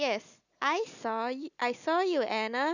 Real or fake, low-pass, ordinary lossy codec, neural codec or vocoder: real; 7.2 kHz; none; none